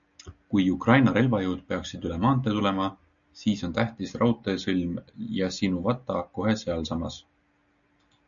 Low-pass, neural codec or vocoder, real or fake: 7.2 kHz; none; real